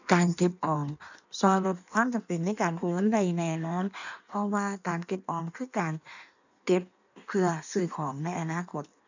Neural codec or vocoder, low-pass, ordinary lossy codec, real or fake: codec, 16 kHz in and 24 kHz out, 1.1 kbps, FireRedTTS-2 codec; 7.2 kHz; none; fake